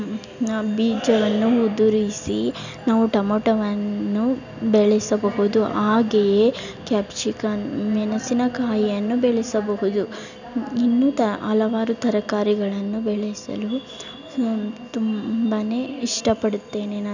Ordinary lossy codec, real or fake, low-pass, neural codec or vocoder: none; real; 7.2 kHz; none